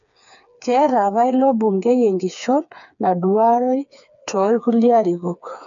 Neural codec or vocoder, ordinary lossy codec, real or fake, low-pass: codec, 16 kHz, 4 kbps, FreqCodec, smaller model; none; fake; 7.2 kHz